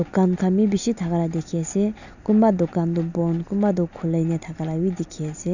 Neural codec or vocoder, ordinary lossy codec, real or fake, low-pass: none; none; real; 7.2 kHz